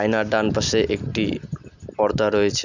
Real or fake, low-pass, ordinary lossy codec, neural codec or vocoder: real; 7.2 kHz; none; none